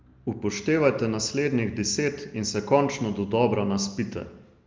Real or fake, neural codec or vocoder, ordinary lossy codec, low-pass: real; none; Opus, 24 kbps; 7.2 kHz